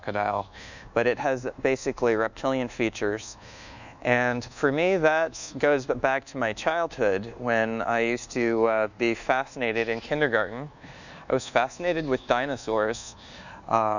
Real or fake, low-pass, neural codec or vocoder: fake; 7.2 kHz; codec, 24 kHz, 1.2 kbps, DualCodec